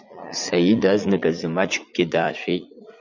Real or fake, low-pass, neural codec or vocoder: fake; 7.2 kHz; vocoder, 44.1 kHz, 80 mel bands, Vocos